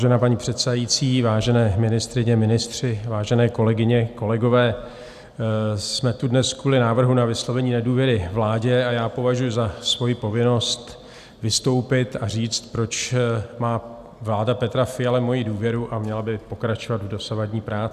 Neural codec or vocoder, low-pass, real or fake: none; 14.4 kHz; real